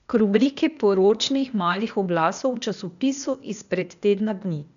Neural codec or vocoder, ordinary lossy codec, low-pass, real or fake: codec, 16 kHz, 0.8 kbps, ZipCodec; none; 7.2 kHz; fake